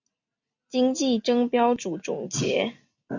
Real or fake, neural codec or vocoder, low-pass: real; none; 7.2 kHz